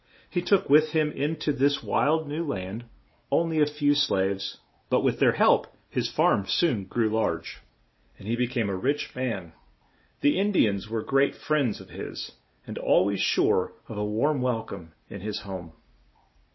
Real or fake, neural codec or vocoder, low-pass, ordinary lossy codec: real; none; 7.2 kHz; MP3, 24 kbps